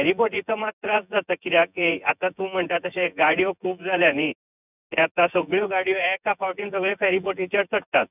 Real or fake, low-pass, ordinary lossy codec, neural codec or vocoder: fake; 3.6 kHz; none; vocoder, 24 kHz, 100 mel bands, Vocos